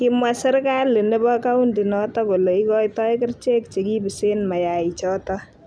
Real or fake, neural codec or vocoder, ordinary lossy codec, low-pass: real; none; none; none